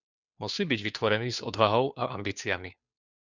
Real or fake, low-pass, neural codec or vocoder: fake; 7.2 kHz; codec, 16 kHz, 2 kbps, FunCodec, trained on Chinese and English, 25 frames a second